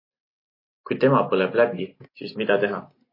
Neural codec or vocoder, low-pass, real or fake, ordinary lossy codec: none; 5.4 kHz; real; MP3, 24 kbps